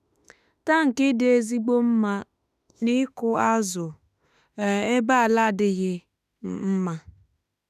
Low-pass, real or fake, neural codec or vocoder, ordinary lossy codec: 14.4 kHz; fake; autoencoder, 48 kHz, 32 numbers a frame, DAC-VAE, trained on Japanese speech; none